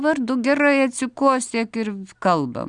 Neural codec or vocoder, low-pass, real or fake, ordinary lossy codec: none; 9.9 kHz; real; Opus, 64 kbps